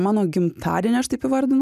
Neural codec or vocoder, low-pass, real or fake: none; 14.4 kHz; real